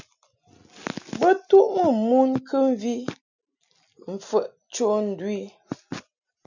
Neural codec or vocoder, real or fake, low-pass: none; real; 7.2 kHz